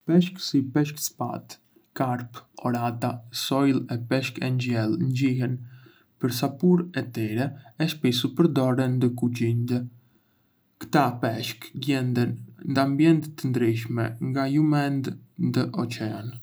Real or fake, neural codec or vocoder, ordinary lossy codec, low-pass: real; none; none; none